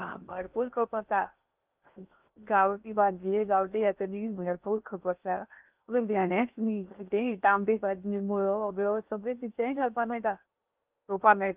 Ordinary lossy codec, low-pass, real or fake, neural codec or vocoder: Opus, 32 kbps; 3.6 kHz; fake; codec, 16 kHz in and 24 kHz out, 0.6 kbps, FocalCodec, streaming, 2048 codes